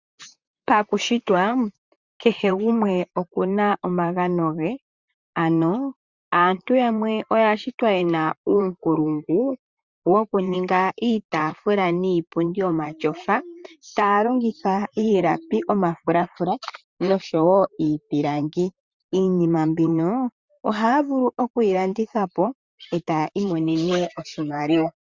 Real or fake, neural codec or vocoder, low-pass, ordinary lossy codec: fake; vocoder, 44.1 kHz, 128 mel bands, Pupu-Vocoder; 7.2 kHz; Opus, 64 kbps